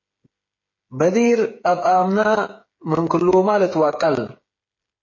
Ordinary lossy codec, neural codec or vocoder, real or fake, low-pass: MP3, 32 kbps; codec, 16 kHz, 8 kbps, FreqCodec, smaller model; fake; 7.2 kHz